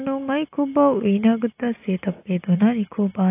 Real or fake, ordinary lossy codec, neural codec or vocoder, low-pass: real; AAC, 24 kbps; none; 3.6 kHz